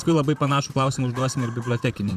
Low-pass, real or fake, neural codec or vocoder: 14.4 kHz; fake; vocoder, 44.1 kHz, 128 mel bands, Pupu-Vocoder